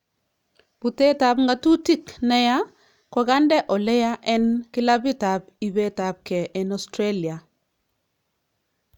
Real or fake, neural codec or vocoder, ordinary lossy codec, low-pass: real; none; none; 19.8 kHz